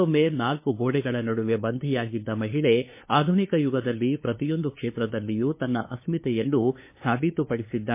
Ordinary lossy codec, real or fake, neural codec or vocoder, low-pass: MP3, 24 kbps; fake; codec, 16 kHz, 2 kbps, FunCodec, trained on LibriTTS, 25 frames a second; 3.6 kHz